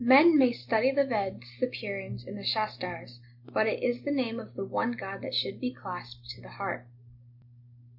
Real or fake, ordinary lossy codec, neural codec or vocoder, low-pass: real; MP3, 48 kbps; none; 5.4 kHz